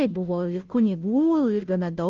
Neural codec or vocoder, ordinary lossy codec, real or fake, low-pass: codec, 16 kHz, 0.5 kbps, FunCodec, trained on Chinese and English, 25 frames a second; Opus, 24 kbps; fake; 7.2 kHz